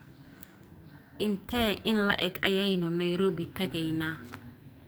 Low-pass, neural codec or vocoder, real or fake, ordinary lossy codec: none; codec, 44.1 kHz, 2.6 kbps, SNAC; fake; none